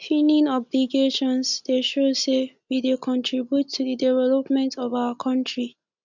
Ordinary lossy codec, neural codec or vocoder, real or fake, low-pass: none; none; real; 7.2 kHz